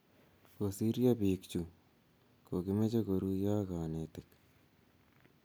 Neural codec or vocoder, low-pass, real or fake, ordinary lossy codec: none; none; real; none